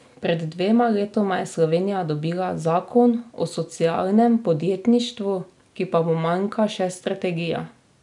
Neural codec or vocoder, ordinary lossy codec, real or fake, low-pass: none; none; real; 10.8 kHz